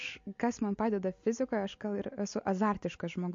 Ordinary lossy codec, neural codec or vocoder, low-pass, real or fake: MP3, 48 kbps; none; 7.2 kHz; real